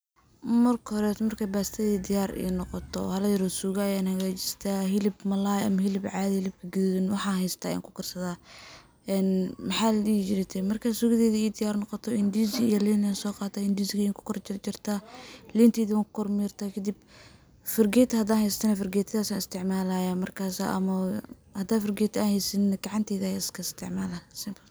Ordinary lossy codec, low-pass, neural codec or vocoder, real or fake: none; none; none; real